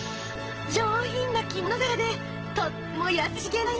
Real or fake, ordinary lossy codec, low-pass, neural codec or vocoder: real; Opus, 16 kbps; 7.2 kHz; none